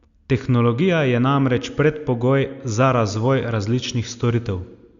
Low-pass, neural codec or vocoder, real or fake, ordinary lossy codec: 7.2 kHz; none; real; Opus, 64 kbps